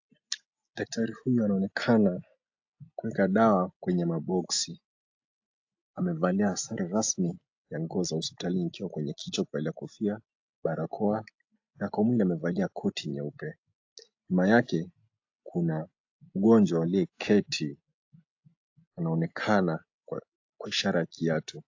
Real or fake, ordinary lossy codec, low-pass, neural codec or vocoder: real; AAC, 48 kbps; 7.2 kHz; none